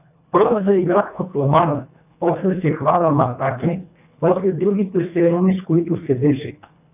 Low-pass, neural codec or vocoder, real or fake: 3.6 kHz; codec, 24 kHz, 1.5 kbps, HILCodec; fake